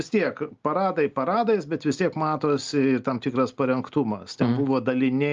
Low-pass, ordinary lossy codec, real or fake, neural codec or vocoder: 7.2 kHz; Opus, 32 kbps; real; none